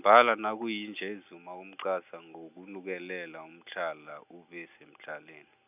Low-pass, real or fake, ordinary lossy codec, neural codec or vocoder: 3.6 kHz; real; none; none